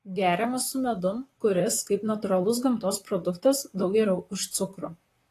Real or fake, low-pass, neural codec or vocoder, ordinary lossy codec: fake; 14.4 kHz; vocoder, 44.1 kHz, 128 mel bands, Pupu-Vocoder; AAC, 48 kbps